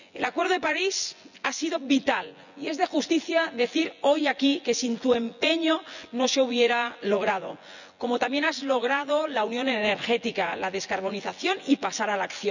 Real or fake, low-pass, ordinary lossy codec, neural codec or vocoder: fake; 7.2 kHz; none; vocoder, 24 kHz, 100 mel bands, Vocos